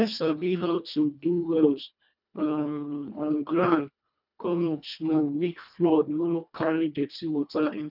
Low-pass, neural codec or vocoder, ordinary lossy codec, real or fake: 5.4 kHz; codec, 24 kHz, 1.5 kbps, HILCodec; none; fake